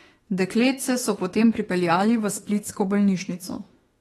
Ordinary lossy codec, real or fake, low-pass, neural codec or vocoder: AAC, 32 kbps; fake; 19.8 kHz; autoencoder, 48 kHz, 32 numbers a frame, DAC-VAE, trained on Japanese speech